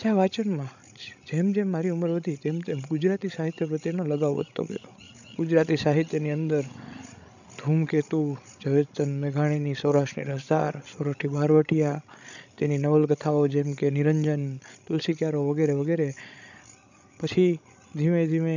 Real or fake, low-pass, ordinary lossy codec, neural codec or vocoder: fake; 7.2 kHz; none; codec, 16 kHz, 16 kbps, FreqCodec, larger model